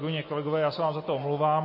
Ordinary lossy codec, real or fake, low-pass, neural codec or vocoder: MP3, 24 kbps; real; 5.4 kHz; none